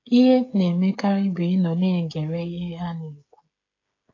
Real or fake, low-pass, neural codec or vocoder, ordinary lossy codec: fake; 7.2 kHz; codec, 16 kHz, 16 kbps, FreqCodec, smaller model; AAC, 32 kbps